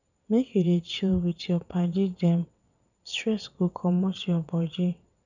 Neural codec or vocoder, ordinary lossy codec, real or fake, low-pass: vocoder, 22.05 kHz, 80 mel bands, WaveNeXt; none; fake; 7.2 kHz